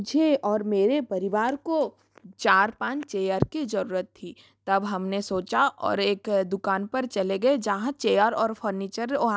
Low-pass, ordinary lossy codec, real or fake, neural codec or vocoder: none; none; real; none